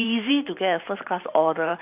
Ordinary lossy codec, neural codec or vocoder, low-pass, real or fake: none; vocoder, 44.1 kHz, 128 mel bands every 512 samples, BigVGAN v2; 3.6 kHz; fake